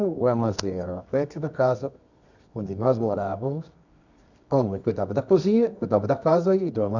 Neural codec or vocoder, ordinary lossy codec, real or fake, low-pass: codec, 24 kHz, 0.9 kbps, WavTokenizer, medium music audio release; none; fake; 7.2 kHz